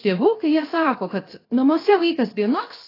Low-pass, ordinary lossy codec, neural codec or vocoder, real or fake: 5.4 kHz; AAC, 24 kbps; codec, 16 kHz, 0.7 kbps, FocalCodec; fake